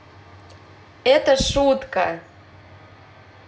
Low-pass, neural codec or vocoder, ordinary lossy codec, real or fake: none; none; none; real